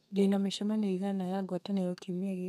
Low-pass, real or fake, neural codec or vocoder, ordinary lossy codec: 14.4 kHz; fake; codec, 32 kHz, 1.9 kbps, SNAC; none